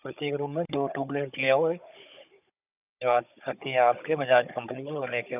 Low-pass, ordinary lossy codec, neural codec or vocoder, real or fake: 3.6 kHz; none; codec, 16 kHz, 16 kbps, FunCodec, trained on Chinese and English, 50 frames a second; fake